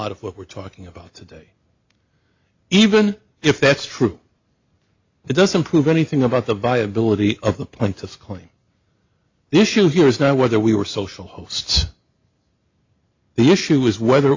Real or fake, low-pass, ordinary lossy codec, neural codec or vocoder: real; 7.2 kHz; AAC, 48 kbps; none